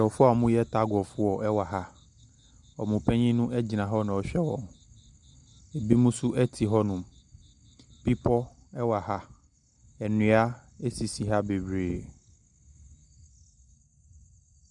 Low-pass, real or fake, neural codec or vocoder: 10.8 kHz; real; none